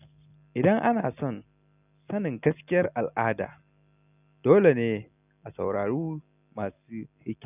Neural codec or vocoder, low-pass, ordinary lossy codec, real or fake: none; 3.6 kHz; none; real